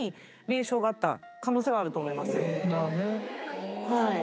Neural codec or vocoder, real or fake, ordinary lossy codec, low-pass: codec, 16 kHz, 4 kbps, X-Codec, HuBERT features, trained on general audio; fake; none; none